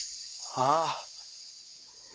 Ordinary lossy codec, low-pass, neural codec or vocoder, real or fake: none; none; codec, 16 kHz, 4 kbps, X-Codec, WavLM features, trained on Multilingual LibriSpeech; fake